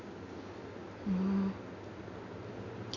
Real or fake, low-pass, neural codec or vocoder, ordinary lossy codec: real; 7.2 kHz; none; none